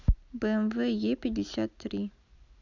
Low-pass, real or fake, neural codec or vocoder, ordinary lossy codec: 7.2 kHz; real; none; none